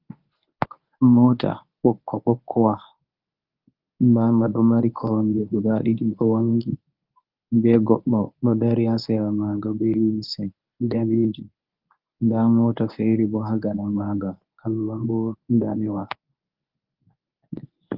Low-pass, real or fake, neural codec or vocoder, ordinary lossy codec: 5.4 kHz; fake; codec, 24 kHz, 0.9 kbps, WavTokenizer, medium speech release version 1; Opus, 32 kbps